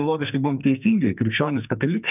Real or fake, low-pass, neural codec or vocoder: fake; 3.6 kHz; codec, 44.1 kHz, 2.6 kbps, SNAC